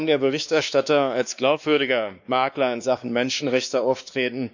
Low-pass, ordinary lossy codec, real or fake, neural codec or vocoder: 7.2 kHz; none; fake; codec, 16 kHz, 2 kbps, X-Codec, WavLM features, trained on Multilingual LibriSpeech